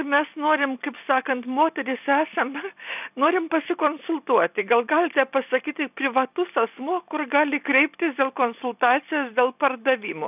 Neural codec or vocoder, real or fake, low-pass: none; real; 3.6 kHz